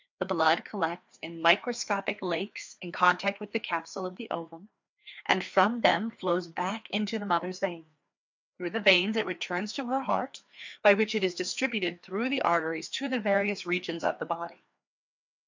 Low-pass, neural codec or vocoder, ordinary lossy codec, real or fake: 7.2 kHz; codec, 16 kHz, 2 kbps, FreqCodec, larger model; MP3, 64 kbps; fake